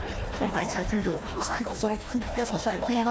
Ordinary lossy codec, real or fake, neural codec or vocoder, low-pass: none; fake; codec, 16 kHz, 1 kbps, FunCodec, trained on Chinese and English, 50 frames a second; none